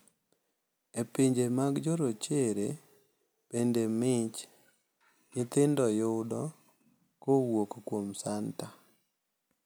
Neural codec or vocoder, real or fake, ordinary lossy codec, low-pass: none; real; none; none